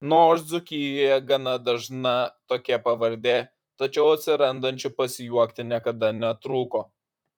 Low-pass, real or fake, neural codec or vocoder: 19.8 kHz; fake; vocoder, 44.1 kHz, 128 mel bands, Pupu-Vocoder